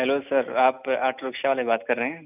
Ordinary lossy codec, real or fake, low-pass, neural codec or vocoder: none; real; 3.6 kHz; none